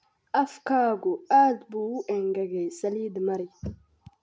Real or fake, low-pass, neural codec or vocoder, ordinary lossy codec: real; none; none; none